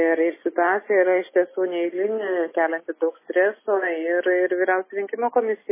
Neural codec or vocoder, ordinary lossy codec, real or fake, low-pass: none; MP3, 16 kbps; real; 3.6 kHz